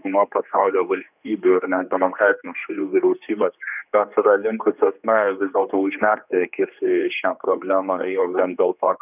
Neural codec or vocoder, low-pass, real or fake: codec, 16 kHz, 2 kbps, X-Codec, HuBERT features, trained on general audio; 3.6 kHz; fake